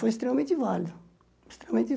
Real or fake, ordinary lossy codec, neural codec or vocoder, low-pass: real; none; none; none